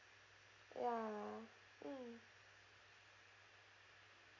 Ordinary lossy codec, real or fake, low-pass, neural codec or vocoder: none; real; 7.2 kHz; none